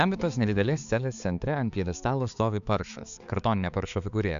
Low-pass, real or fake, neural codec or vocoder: 7.2 kHz; fake; codec, 16 kHz, 4 kbps, X-Codec, HuBERT features, trained on balanced general audio